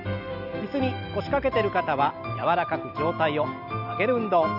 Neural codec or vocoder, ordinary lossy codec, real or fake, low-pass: none; none; real; 5.4 kHz